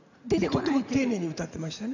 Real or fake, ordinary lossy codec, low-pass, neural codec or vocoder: fake; none; 7.2 kHz; vocoder, 44.1 kHz, 80 mel bands, Vocos